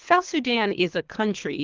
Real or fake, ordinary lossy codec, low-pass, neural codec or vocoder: fake; Opus, 24 kbps; 7.2 kHz; codec, 24 kHz, 3 kbps, HILCodec